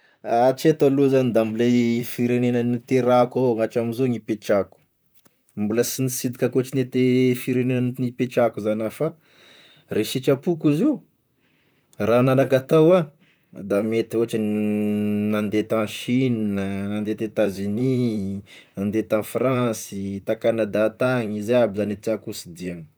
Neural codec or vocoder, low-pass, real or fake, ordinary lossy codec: vocoder, 44.1 kHz, 128 mel bands, Pupu-Vocoder; none; fake; none